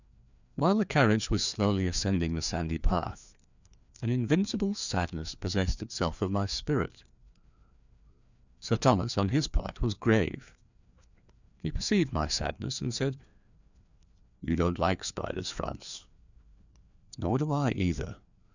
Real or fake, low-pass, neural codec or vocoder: fake; 7.2 kHz; codec, 16 kHz, 2 kbps, FreqCodec, larger model